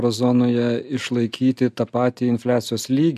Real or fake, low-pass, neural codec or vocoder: real; 14.4 kHz; none